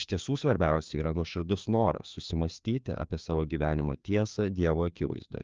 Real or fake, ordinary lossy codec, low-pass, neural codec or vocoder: fake; Opus, 24 kbps; 7.2 kHz; codec, 16 kHz, 2 kbps, FreqCodec, larger model